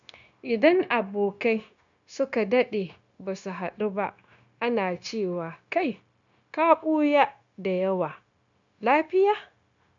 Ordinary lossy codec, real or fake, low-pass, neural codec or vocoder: none; fake; 7.2 kHz; codec, 16 kHz, 0.9 kbps, LongCat-Audio-Codec